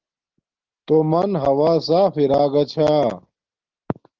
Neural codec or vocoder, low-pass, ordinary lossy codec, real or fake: none; 7.2 kHz; Opus, 16 kbps; real